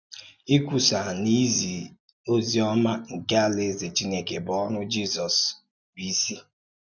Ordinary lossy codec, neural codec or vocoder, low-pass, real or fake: none; none; 7.2 kHz; real